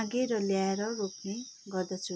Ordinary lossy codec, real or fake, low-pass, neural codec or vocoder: none; real; none; none